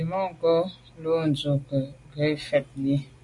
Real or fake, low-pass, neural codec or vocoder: real; 10.8 kHz; none